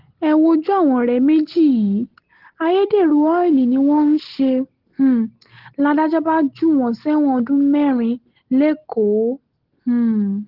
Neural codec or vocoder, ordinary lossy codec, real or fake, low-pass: none; Opus, 16 kbps; real; 5.4 kHz